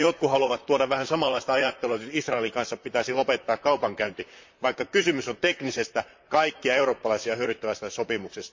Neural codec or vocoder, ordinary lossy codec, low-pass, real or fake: vocoder, 44.1 kHz, 128 mel bands, Pupu-Vocoder; MP3, 48 kbps; 7.2 kHz; fake